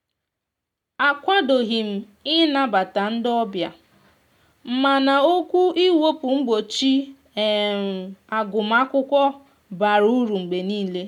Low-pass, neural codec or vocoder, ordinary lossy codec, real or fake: 19.8 kHz; none; none; real